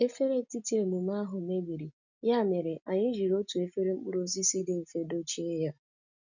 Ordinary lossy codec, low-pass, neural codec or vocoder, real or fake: none; 7.2 kHz; none; real